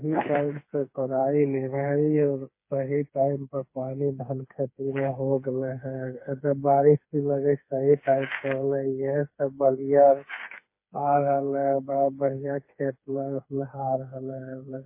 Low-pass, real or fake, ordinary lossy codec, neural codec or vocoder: 3.6 kHz; fake; MP3, 24 kbps; codec, 16 kHz, 4 kbps, FreqCodec, smaller model